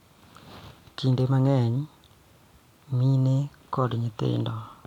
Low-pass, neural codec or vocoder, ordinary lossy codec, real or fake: 19.8 kHz; none; none; real